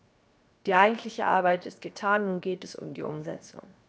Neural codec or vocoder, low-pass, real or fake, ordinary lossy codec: codec, 16 kHz, 0.7 kbps, FocalCodec; none; fake; none